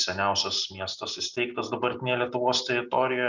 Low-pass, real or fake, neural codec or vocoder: 7.2 kHz; real; none